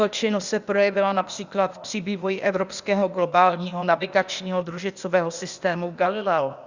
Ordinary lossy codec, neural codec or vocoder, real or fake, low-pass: Opus, 64 kbps; codec, 16 kHz, 0.8 kbps, ZipCodec; fake; 7.2 kHz